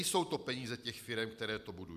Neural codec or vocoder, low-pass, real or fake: vocoder, 44.1 kHz, 128 mel bands every 256 samples, BigVGAN v2; 14.4 kHz; fake